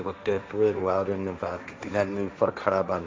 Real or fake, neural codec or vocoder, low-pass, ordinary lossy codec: fake; codec, 16 kHz, 1.1 kbps, Voila-Tokenizer; none; none